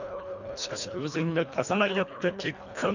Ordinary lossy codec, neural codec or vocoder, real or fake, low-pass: none; codec, 24 kHz, 1.5 kbps, HILCodec; fake; 7.2 kHz